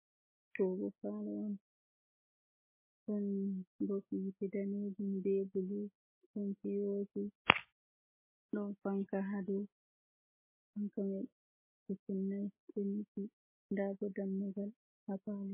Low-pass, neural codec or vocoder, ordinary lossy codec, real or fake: 3.6 kHz; none; MP3, 16 kbps; real